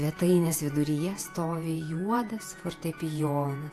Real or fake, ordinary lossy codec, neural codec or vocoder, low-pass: fake; AAC, 64 kbps; vocoder, 48 kHz, 128 mel bands, Vocos; 14.4 kHz